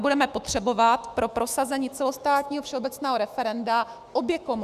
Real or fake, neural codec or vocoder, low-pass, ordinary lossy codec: fake; autoencoder, 48 kHz, 128 numbers a frame, DAC-VAE, trained on Japanese speech; 14.4 kHz; Opus, 64 kbps